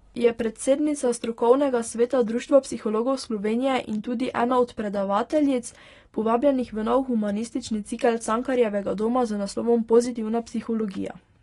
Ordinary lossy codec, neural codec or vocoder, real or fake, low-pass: AAC, 32 kbps; none; real; 10.8 kHz